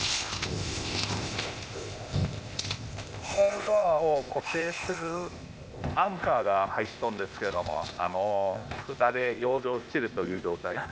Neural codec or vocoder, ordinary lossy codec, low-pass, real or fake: codec, 16 kHz, 0.8 kbps, ZipCodec; none; none; fake